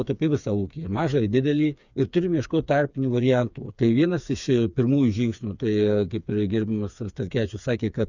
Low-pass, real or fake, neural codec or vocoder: 7.2 kHz; fake; codec, 16 kHz, 4 kbps, FreqCodec, smaller model